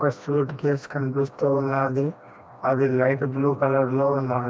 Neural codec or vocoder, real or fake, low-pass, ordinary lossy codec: codec, 16 kHz, 1 kbps, FreqCodec, smaller model; fake; none; none